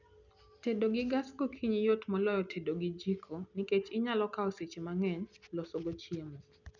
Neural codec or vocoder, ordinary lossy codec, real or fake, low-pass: none; none; real; 7.2 kHz